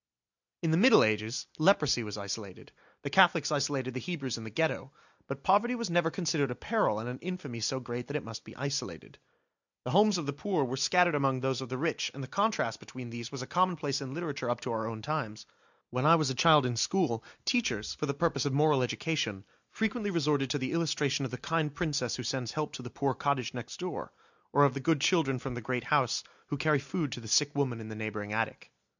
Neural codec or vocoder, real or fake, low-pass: none; real; 7.2 kHz